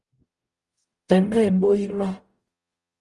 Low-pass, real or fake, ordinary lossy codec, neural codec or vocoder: 10.8 kHz; fake; Opus, 32 kbps; codec, 44.1 kHz, 0.9 kbps, DAC